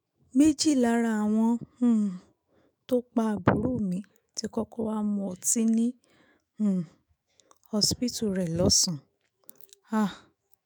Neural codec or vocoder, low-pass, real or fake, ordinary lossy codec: autoencoder, 48 kHz, 128 numbers a frame, DAC-VAE, trained on Japanese speech; none; fake; none